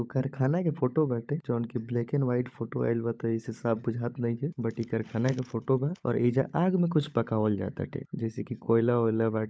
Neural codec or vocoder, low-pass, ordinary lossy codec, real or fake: codec, 16 kHz, 16 kbps, FunCodec, trained on LibriTTS, 50 frames a second; none; none; fake